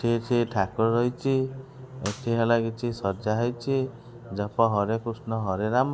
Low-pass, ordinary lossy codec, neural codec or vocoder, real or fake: none; none; none; real